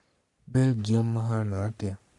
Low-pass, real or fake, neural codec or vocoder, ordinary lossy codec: 10.8 kHz; fake; codec, 44.1 kHz, 3.4 kbps, Pupu-Codec; none